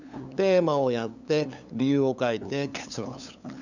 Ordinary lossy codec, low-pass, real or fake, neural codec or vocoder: none; 7.2 kHz; fake; codec, 16 kHz, 8 kbps, FunCodec, trained on LibriTTS, 25 frames a second